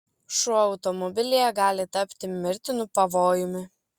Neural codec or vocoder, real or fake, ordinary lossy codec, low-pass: none; real; Opus, 64 kbps; 19.8 kHz